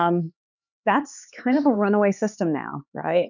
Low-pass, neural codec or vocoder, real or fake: 7.2 kHz; codec, 16 kHz, 4 kbps, X-Codec, HuBERT features, trained on LibriSpeech; fake